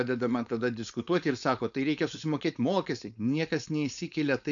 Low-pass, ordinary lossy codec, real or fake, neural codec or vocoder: 7.2 kHz; MP3, 96 kbps; fake; codec, 16 kHz, 4.8 kbps, FACodec